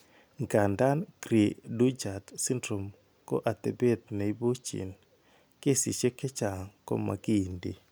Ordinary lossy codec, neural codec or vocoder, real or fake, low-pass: none; none; real; none